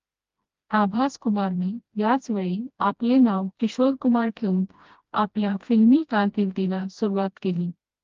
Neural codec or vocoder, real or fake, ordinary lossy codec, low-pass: codec, 16 kHz, 1 kbps, FreqCodec, smaller model; fake; Opus, 32 kbps; 7.2 kHz